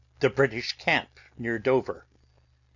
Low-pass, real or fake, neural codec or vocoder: 7.2 kHz; real; none